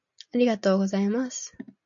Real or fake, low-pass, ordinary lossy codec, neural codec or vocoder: real; 7.2 kHz; MP3, 48 kbps; none